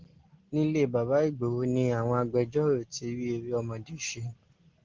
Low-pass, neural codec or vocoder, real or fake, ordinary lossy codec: 7.2 kHz; none; real; Opus, 16 kbps